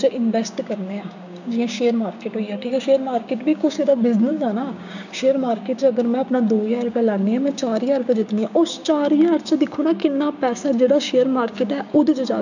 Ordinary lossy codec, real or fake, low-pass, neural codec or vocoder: none; fake; 7.2 kHz; codec, 16 kHz, 6 kbps, DAC